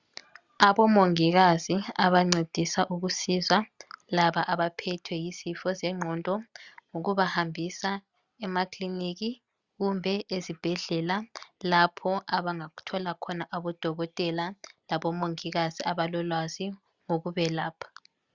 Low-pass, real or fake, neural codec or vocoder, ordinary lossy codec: 7.2 kHz; real; none; Opus, 64 kbps